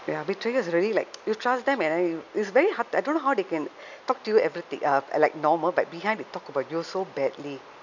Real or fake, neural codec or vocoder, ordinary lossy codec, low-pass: real; none; none; 7.2 kHz